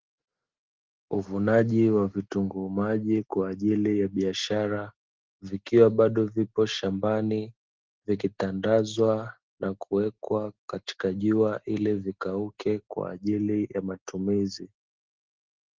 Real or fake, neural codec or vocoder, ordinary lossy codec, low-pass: real; none; Opus, 16 kbps; 7.2 kHz